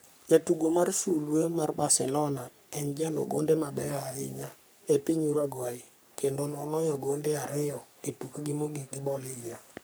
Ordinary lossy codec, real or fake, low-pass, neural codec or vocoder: none; fake; none; codec, 44.1 kHz, 3.4 kbps, Pupu-Codec